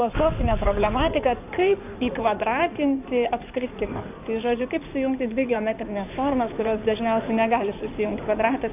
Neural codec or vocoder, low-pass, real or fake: codec, 16 kHz in and 24 kHz out, 2.2 kbps, FireRedTTS-2 codec; 3.6 kHz; fake